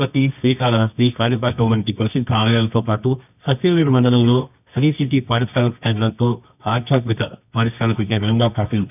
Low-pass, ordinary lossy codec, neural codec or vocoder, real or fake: 3.6 kHz; none; codec, 24 kHz, 0.9 kbps, WavTokenizer, medium music audio release; fake